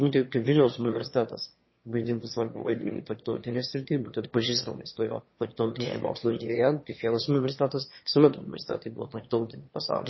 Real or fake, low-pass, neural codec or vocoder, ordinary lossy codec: fake; 7.2 kHz; autoencoder, 22.05 kHz, a latent of 192 numbers a frame, VITS, trained on one speaker; MP3, 24 kbps